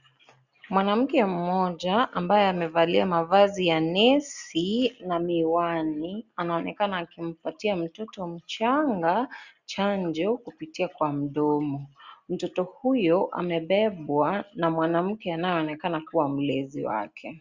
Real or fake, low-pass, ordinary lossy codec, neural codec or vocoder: real; 7.2 kHz; Opus, 64 kbps; none